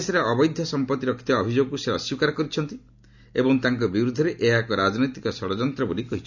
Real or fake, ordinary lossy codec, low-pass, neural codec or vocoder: real; none; 7.2 kHz; none